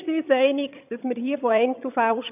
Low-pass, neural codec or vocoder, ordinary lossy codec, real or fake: 3.6 kHz; vocoder, 22.05 kHz, 80 mel bands, HiFi-GAN; none; fake